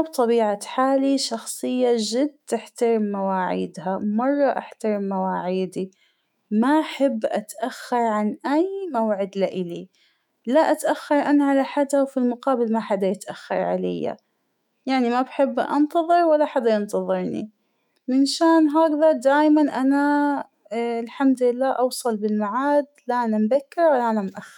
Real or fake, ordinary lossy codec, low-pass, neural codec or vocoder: fake; none; 19.8 kHz; autoencoder, 48 kHz, 128 numbers a frame, DAC-VAE, trained on Japanese speech